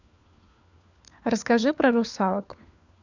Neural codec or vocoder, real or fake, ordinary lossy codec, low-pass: codec, 16 kHz, 4 kbps, FunCodec, trained on LibriTTS, 50 frames a second; fake; none; 7.2 kHz